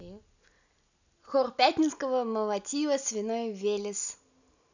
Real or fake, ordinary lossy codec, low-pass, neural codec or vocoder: real; none; 7.2 kHz; none